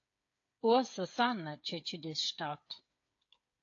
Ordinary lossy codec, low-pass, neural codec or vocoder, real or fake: AAC, 48 kbps; 7.2 kHz; codec, 16 kHz, 8 kbps, FreqCodec, smaller model; fake